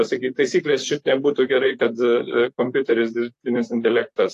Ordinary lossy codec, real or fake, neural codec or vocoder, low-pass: AAC, 64 kbps; fake; vocoder, 44.1 kHz, 128 mel bands, Pupu-Vocoder; 14.4 kHz